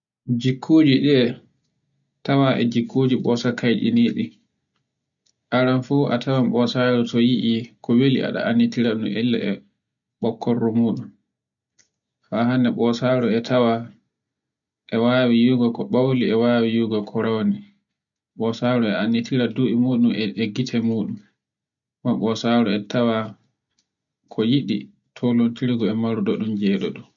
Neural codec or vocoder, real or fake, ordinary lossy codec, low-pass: none; real; none; 7.2 kHz